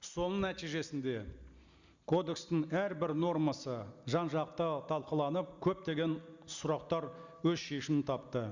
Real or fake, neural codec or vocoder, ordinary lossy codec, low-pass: real; none; Opus, 64 kbps; 7.2 kHz